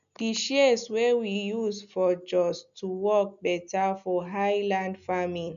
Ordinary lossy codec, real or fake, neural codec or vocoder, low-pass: MP3, 96 kbps; real; none; 7.2 kHz